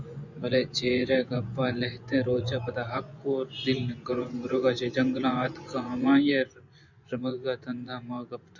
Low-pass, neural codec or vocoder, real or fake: 7.2 kHz; vocoder, 24 kHz, 100 mel bands, Vocos; fake